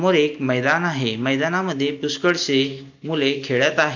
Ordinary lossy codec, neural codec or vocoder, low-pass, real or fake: none; none; 7.2 kHz; real